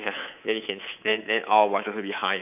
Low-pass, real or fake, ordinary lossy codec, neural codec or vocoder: 3.6 kHz; fake; none; codec, 24 kHz, 3.1 kbps, DualCodec